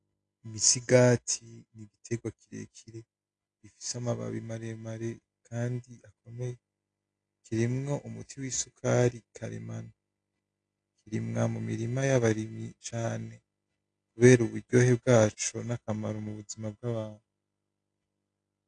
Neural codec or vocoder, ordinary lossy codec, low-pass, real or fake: none; AAC, 48 kbps; 9.9 kHz; real